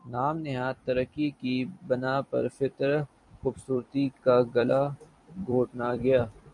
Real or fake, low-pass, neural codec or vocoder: fake; 10.8 kHz; vocoder, 44.1 kHz, 128 mel bands every 256 samples, BigVGAN v2